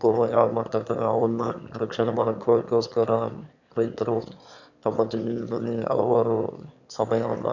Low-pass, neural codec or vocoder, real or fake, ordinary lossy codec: 7.2 kHz; autoencoder, 22.05 kHz, a latent of 192 numbers a frame, VITS, trained on one speaker; fake; none